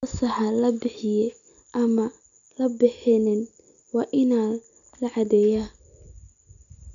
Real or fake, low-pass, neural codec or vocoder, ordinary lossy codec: real; 7.2 kHz; none; none